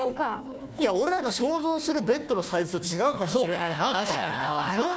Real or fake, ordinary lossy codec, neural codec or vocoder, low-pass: fake; none; codec, 16 kHz, 1 kbps, FunCodec, trained on Chinese and English, 50 frames a second; none